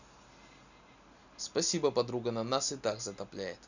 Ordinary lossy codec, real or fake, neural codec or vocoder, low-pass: none; real; none; 7.2 kHz